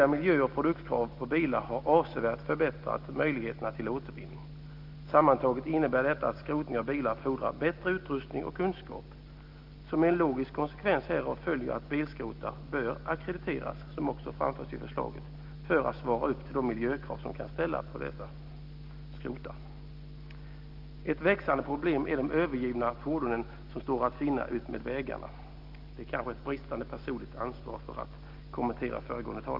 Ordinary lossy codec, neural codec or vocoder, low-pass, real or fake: Opus, 32 kbps; none; 5.4 kHz; real